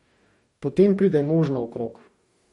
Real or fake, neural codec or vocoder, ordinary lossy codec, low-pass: fake; codec, 44.1 kHz, 2.6 kbps, DAC; MP3, 48 kbps; 19.8 kHz